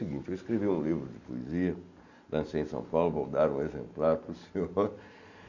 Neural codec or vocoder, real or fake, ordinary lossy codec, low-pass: none; real; none; 7.2 kHz